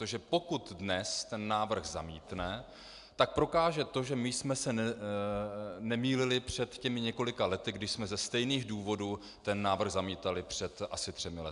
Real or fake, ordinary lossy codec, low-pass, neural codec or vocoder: real; AAC, 96 kbps; 10.8 kHz; none